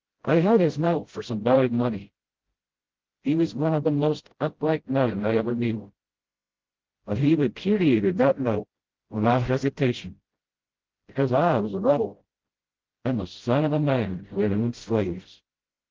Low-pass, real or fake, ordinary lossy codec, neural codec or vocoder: 7.2 kHz; fake; Opus, 16 kbps; codec, 16 kHz, 0.5 kbps, FreqCodec, smaller model